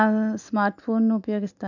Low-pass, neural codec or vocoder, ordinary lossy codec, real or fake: 7.2 kHz; none; none; real